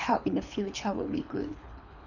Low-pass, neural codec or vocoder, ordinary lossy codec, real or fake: 7.2 kHz; codec, 24 kHz, 3 kbps, HILCodec; none; fake